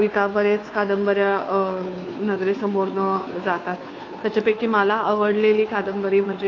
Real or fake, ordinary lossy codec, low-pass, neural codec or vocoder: fake; AAC, 32 kbps; 7.2 kHz; codec, 16 kHz, 2 kbps, FunCodec, trained on Chinese and English, 25 frames a second